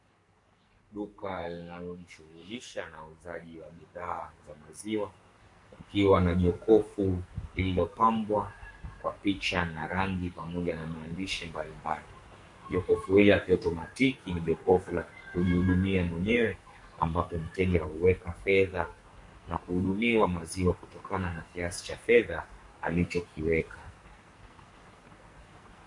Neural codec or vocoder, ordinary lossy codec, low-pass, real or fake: codec, 44.1 kHz, 2.6 kbps, SNAC; MP3, 48 kbps; 10.8 kHz; fake